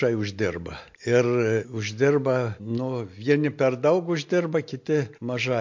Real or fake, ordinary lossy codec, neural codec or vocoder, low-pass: real; MP3, 48 kbps; none; 7.2 kHz